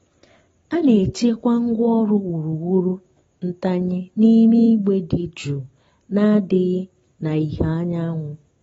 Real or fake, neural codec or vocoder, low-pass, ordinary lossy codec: real; none; 19.8 kHz; AAC, 24 kbps